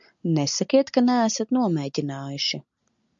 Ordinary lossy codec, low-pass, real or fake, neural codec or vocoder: MP3, 96 kbps; 7.2 kHz; real; none